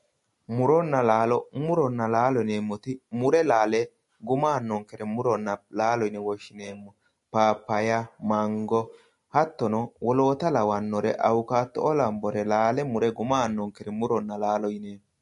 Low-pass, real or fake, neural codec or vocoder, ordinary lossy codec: 14.4 kHz; real; none; MP3, 48 kbps